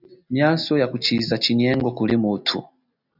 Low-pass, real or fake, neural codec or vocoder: 5.4 kHz; real; none